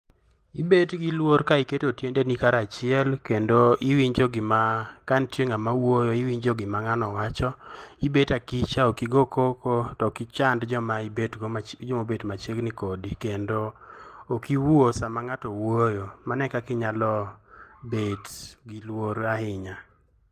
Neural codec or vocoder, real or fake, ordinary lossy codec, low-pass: none; real; Opus, 24 kbps; 14.4 kHz